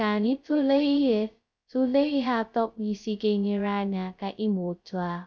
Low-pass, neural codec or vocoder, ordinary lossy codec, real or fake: none; codec, 16 kHz, 0.2 kbps, FocalCodec; none; fake